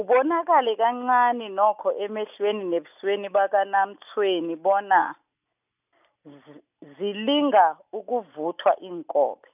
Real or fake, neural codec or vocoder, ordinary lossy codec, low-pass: real; none; none; 3.6 kHz